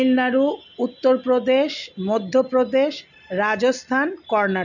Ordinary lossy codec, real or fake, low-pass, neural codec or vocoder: none; real; 7.2 kHz; none